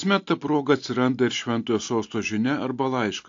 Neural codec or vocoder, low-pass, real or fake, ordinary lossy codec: none; 7.2 kHz; real; AAC, 48 kbps